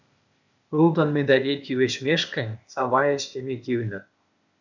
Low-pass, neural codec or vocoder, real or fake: 7.2 kHz; codec, 16 kHz, 0.8 kbps, ZipCodec; fake